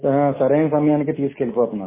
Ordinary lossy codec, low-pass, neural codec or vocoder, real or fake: MP3, 16 kbps; 3.6 kHz; none; real